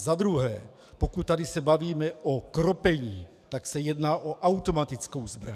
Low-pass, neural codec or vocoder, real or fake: 14.4 kHz; codec, 44.1 kHz, 7.8 kbps, DAC; fake